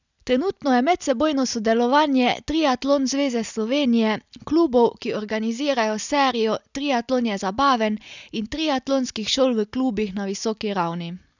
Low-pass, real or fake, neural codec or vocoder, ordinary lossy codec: 7.2 kHz; real; none; none